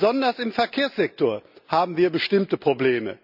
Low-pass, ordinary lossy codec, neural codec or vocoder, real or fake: 5.4 kHz; none; none; real